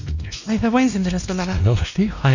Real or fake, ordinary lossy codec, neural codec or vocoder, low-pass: fake; none; codec, 16 kHz, 1 kbps, X-Codec, WavLM features, trained on Multilingual LibriSpeech; 7.2 kHz